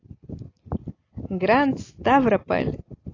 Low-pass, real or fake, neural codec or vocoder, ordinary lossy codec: 7.2 kHz; real; none; AAC, 32 kbps